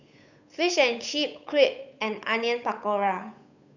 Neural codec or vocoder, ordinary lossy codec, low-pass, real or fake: codec, 16 kHz, 8 kbps, FunCodec, trained on Chinese and English, 25 frames a second; none; 7.2 kHz; fake